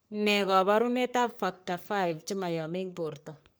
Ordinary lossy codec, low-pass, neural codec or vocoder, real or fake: none; none; codec, 44.1 kHz, 3.4 kbps, Pupu-Codec; fake